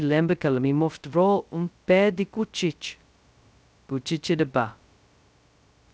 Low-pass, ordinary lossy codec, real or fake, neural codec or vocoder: none; none; fake; codec, 16 kHz, 0.2 kbps, FocalCodec